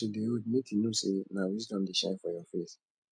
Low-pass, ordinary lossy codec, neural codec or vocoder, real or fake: 9.9 kHz; none; none; real